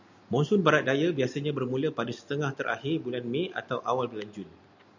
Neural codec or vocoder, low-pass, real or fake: none; 7.2 kHz; real